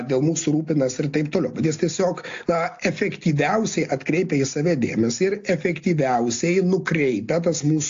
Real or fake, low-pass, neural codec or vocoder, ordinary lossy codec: real; 7.2 kHz; none; AAC, 48 kbps